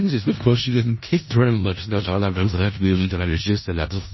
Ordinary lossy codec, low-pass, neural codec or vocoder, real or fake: MP3, 24 kbps; 7.2 kHz; codec, 16 kHz in and 24 kHz out, 0.4 kbps, LongCat-Audio-Codec, four codebook decoder; fake